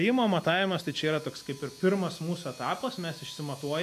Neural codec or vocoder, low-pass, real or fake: none; 14.4 kHz; real